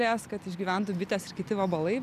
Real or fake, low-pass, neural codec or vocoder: real; 14.4 kHz; none